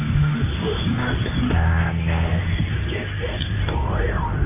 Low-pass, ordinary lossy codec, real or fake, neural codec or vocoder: 3.6 kHz; AAC, 16 kbps; fake; codec, 24 kHz, 0.9 kbps, WavTokenizer, medium speech release version 2